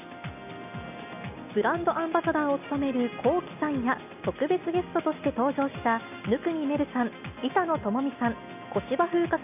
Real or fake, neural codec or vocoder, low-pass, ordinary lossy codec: real; none; 3.6 kHz; none